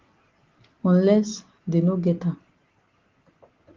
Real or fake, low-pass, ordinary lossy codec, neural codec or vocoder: real; 7.2 kHz; Opus, 16 kbps; none